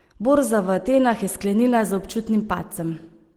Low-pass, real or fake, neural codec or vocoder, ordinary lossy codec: 19.8 kHz; real; none; Opus, 16 kbps